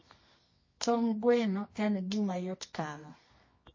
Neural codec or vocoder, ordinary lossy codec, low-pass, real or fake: codec, 24 kHz, 0.9 kbps, WavTokenizer, medium music audio release; MP3, 32 kbps; 7.2 kHz; fake